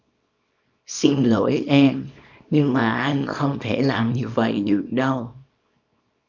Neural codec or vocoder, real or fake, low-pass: codec, 24 kHz, 0.9 kbps, WavTokenizer, small release; fake; 7.2 kHz